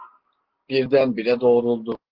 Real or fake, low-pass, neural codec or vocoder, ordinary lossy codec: real; 5.4 kHz; none; Opus, 16 kbps